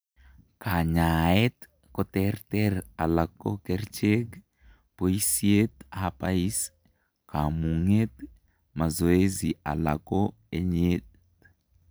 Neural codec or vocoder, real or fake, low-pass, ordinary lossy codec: none; real; none; none